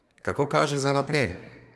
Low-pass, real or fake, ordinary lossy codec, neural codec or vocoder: none; fake; none; codec, 24 kHz, 1 kbps, SNAC